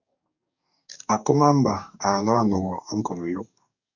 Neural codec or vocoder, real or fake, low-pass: codec, 44.1 kHz, 2.6 kbps, DAC; fake; 7.2 kHz